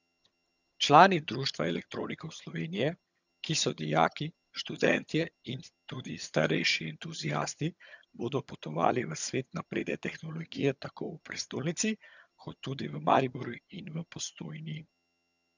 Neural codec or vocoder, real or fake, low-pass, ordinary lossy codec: vocoder, 22.05 kHz, 80 mel bands, HiFi-GAN; fake; 7.2 kHz; none